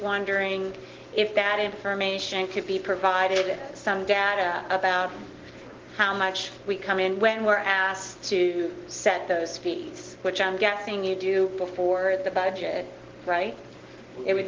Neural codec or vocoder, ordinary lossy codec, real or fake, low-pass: none; Opus, 16 kbps; real; 7.2 kHz